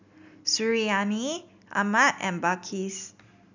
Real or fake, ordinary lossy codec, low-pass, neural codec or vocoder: real; none; 7.2 kHz; none